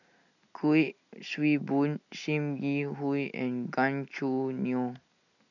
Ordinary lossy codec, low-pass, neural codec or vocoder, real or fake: none; 7.2 kHz; none; real